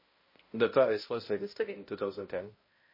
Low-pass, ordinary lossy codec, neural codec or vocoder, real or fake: 5.4 kHz; MP3, 24 kbps; codec, 16 kHz, 0.5 kbps, X-Codec, HuBERT features, trained on balanced general audio; fake